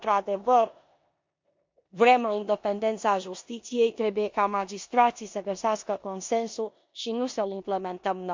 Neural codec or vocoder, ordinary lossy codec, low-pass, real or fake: codec, 16 kHz in and 24 kHz out, 0.9 kbps, LongCat-Audio-Codec, four codebook decoder; MP3, 48 kbps; 7.2 kHz; fake